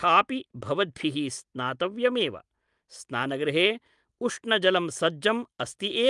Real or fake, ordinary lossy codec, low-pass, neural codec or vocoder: real; Opus, 32 kbps; 10.8 kHz; none